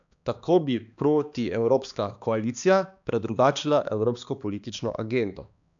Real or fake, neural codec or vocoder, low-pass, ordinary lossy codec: fake; codec, 16 kHz, 2 kbps, X-Codec, HuBERT features, trained on balanced general audio; 7.2 kHz; MP3, 96 kbps